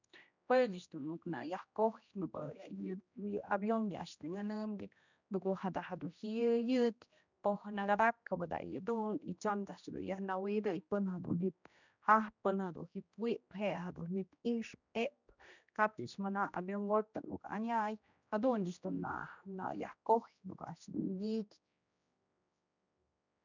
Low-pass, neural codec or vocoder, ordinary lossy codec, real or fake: 7.2 kHz; codec, 16 kHz, 1 kbps, X-Codec, HuBERT features, trained on general audio; none; fake